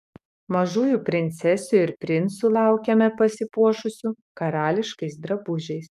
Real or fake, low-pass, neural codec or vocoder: fake; 14.4 kHz; codec, 44.1 kHz, 7.8 kbps, DAC